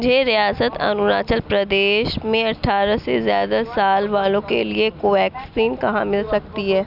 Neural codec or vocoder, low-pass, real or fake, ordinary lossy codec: none; 5.4 kHz; real; none